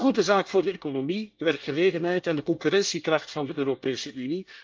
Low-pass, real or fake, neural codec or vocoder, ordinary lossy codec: 7.2 kHz; fake; codec, 24 kHz, 1 kbps, SNAC; Opus, 32 kbps